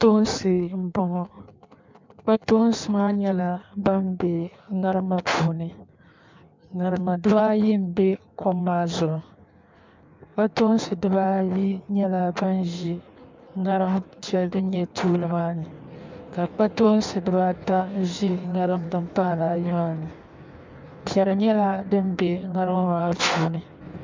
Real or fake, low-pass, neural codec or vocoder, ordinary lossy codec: fake; 7.2 kHz; codec, 16 kHz in and 24 kHz out, 1.1 kbps, FireRedTTS-2 codec; MP3, 64 kbps